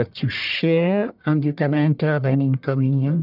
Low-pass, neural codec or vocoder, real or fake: 5.4 kHz; codec, 44.1 kHz, 1.7 kbps, Pupu-Codec; fake